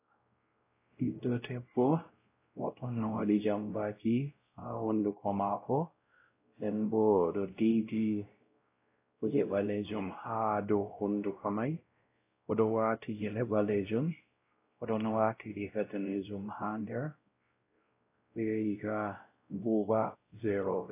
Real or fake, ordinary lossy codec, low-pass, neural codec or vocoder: fake; AAC, 24 kbps; 3.6 kHz; codec, 16 kHz, 0.5 kbps, X-Codec, WavLM features, trained on Multilingual LibriSpeech